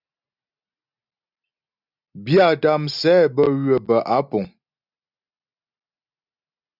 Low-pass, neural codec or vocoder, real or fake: 5.4 kHz; none; real